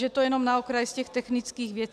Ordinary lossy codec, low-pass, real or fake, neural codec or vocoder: AAC, 96 kbps; 14.4 kHz; real; none